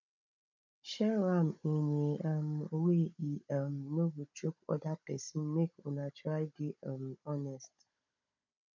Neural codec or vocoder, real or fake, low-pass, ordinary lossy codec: codec, 16 kHz, 16 kbps, FunCodec, trained on Chinese and English, 50 frames a second; fake; 7.2 kHz; MP3, 48 kbps